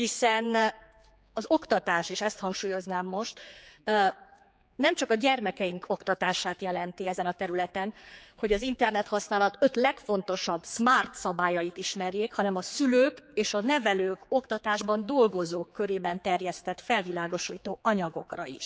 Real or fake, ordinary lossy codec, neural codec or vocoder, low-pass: fake; none; codec, 16 kHz, 4 kbps, X-Codec, HuBERT features, trained on general audio; none